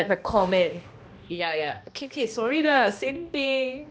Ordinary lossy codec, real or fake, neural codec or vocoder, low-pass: none; fake; codec, 16 kHz, 1 kbps, X-Codec, HuBERT features, trained on balanced general audio; none